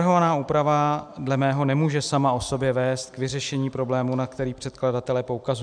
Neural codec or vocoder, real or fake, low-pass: none; real; 9.9 kHz